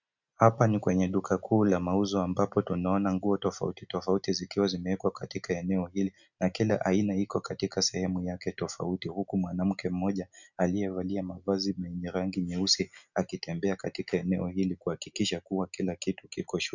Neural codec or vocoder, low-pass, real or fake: none; 7.2 kHz; real